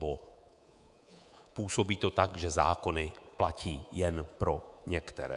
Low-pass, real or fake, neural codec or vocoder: 10.8 kHz; fake; codec, 24 kHz, 3.1 kbps, DualCodec